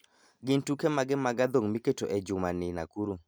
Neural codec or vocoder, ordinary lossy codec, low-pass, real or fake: none; none; none; real